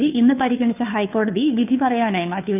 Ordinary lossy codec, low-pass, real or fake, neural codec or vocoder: none; 3.6 kHz; fake; codec, 16 kHz, 2 kbps, FunCodec, trained on Chinese and English, 25 frames a second